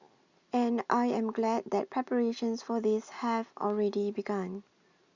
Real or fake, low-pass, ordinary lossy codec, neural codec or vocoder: real; 7.2 kHz; Opus, 64 kbps; none